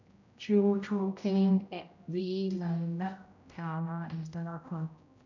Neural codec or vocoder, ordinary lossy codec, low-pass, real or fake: codec, 16 kHz, 0.5 kbps, X-Codec, HuBERT features, trained on general audio; none; 7.2 kHz; fake